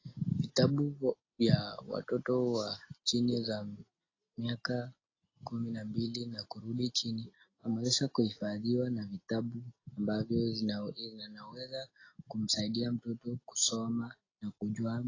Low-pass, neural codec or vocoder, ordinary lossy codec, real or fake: 7.2 kHz; none; AAC, 32 kbps; real